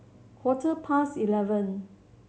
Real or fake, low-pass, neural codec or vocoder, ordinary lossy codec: real; none; none; none